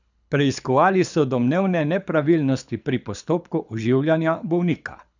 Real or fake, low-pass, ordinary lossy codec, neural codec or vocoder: fake; 7.2 kHz; none; codec, 24 kHz, 6 kbps, HILCodec